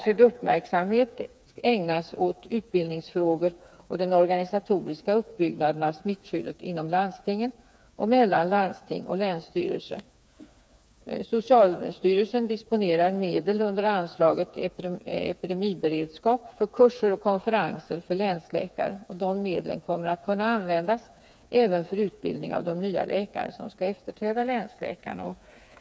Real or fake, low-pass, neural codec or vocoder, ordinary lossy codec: fake; none; codec, 16 kHz, 4 kbps, FreqCodec, smaller model; none